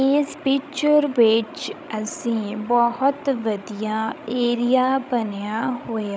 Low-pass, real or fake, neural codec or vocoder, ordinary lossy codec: none; fake; codec, 16 kHz, 16 kbps, FreqCodec, larger model; none